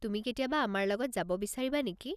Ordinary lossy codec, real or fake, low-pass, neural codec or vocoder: none; real; 14.4 kHz; none